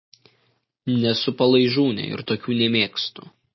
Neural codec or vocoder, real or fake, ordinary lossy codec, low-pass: none; real; MP3, 24 kbps; 7.2 kHz